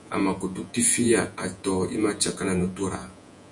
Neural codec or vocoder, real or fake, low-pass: vocoder, 48 kHz, 128 mel bands, Vocos; fake; 10.8 kHz